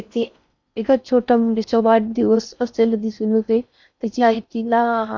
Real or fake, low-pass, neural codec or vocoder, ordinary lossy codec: fake; 7.2 kHz; codec, 16 kHz in and 24 kHz out, 0.6 kbps, FocalCodec, streaming, 4096 codes; none